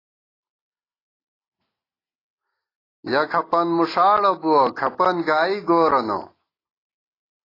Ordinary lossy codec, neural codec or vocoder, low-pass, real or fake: AAC, 24 kbps; none; 5.4 kHz; real